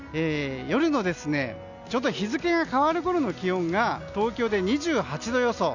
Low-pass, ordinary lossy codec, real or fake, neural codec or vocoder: 7.2 kHz; none; real; none